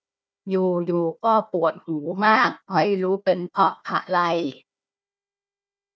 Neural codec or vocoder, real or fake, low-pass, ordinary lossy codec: codec, 16 kHz, 1 kbps, FunCodec, trained on Chinese and English, 50 frames a second; fake; none; none